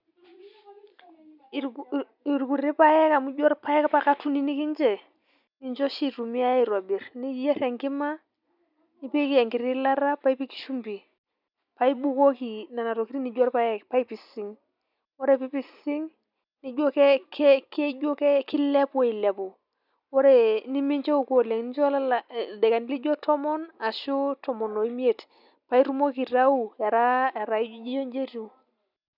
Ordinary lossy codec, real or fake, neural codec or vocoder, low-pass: none; real; none; 5.4 kHz